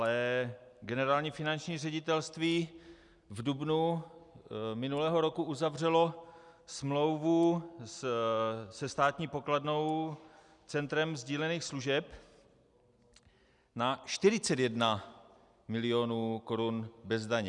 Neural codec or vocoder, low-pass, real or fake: none; 10.8 kHz; real